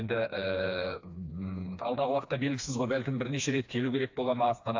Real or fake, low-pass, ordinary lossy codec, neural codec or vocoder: fake; 7.2 kHz; AAC, 48 kbps; codec, 16 kHz, 2 kbps, FreqCodec, smaller model